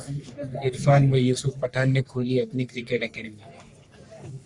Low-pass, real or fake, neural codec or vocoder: 10.8 kHz; fake; codec, 44.1 kHz, 3.4 kbps, Pupu-Codec